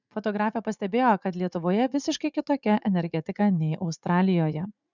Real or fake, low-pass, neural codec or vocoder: fake; 7.2 kHz; vocoder, 44.1 kHz, 80 mel bands, Vocos